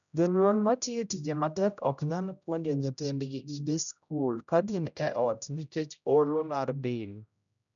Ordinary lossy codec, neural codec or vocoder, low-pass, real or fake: none; codec, 16 kHz, 0.5 kbps, X-Codec, HuBERT features, trained on general audio; 7.2 kHz; fake